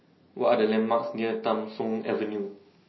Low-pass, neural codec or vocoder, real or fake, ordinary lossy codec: 7.2 kHz; none; real; MP3, 24 kbps